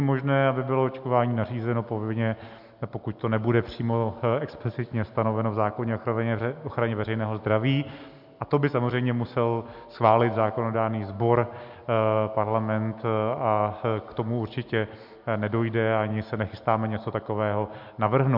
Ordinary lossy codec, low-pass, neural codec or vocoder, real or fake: MP3, 48 kbps; 5.4 kHz; none; real